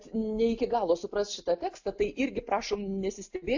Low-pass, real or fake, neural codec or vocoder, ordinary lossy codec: 7.2 kHz; real; none; AAC, 48 kbps